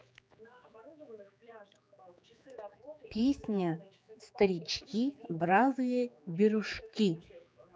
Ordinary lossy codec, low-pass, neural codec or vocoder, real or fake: none; none; codec, 16 kHz, 4 kbps, X-Codec, HuBERT features, trained on general audio; fake